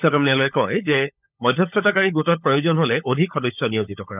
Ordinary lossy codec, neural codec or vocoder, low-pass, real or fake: none; codec, 16 kHz, 16 kbps, FunCodec, trained on LibriTTS, 50 frames a second; 3.6 kHz; fake